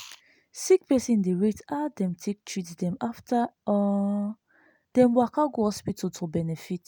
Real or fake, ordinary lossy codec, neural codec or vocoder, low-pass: real; none; none; none